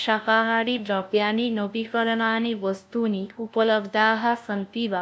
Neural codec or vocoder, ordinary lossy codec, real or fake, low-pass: codec, 16 kHz, 0.5 kbps, FunCodec, trained on LibriTTS, 25 frames a second; none; fake; none